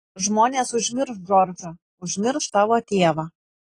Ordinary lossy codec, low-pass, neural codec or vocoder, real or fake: AAC, 32 kbps; 10.8 kHz; none; real